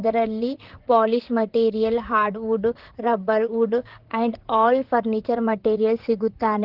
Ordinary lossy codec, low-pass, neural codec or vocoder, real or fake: Opus, 16 kbps; 5.4 kHz; vocoder, 44.1 kHz, 128 mel bands, Pupu-Vocoder; fake